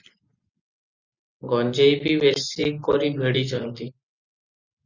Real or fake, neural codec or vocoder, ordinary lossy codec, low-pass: real; none; Opus, 64 kbps; 7.2 kHz